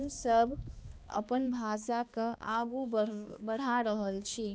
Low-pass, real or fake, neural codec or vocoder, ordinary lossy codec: none; fake; codec, 16 kHz, 2 kbps, X-Codec, HuBERT features, trained on balanced general audio; none